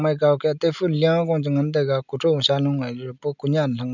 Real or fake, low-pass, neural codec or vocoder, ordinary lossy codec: real; 7.2 kHz; none; none